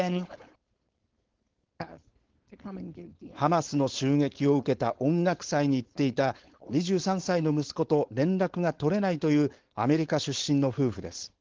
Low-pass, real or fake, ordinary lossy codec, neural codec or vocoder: 7.2 kHz; fake; Opus, 16 kbps; codec, 16 kHz, 4.8 kbps, FACodec